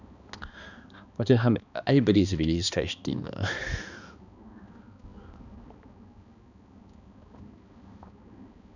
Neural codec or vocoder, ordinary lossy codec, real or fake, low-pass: codec, 16 kHz, 2 kbps, X-Codec, HuBERT features, trained on balanced general audio; none; fake; 7.2 kHz